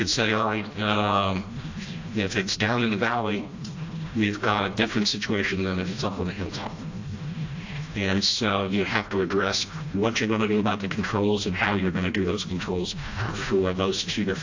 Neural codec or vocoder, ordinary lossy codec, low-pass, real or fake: codec, 16 kHz, 1 kbps, FreqCodec, smaller model; AAC, 48 kbps; 7.2 kHz; fake